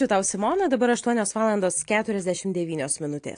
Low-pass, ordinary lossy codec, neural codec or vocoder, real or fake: 9.9 kHz; AAC, 48 kbps; none; real